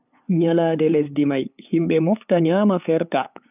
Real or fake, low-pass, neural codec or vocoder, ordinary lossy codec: fake; 3.6 kHz; codec, 16 kHz, 8 kbps, FunCodec, trained on LibriTTS, 25 frames a second; none